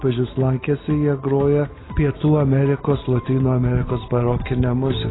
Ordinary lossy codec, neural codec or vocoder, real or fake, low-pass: AAC, 16 kbps; none; real; 7.2 kHz